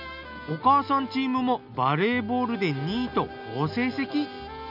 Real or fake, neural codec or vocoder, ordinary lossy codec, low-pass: real; none; none; 5.4 kHz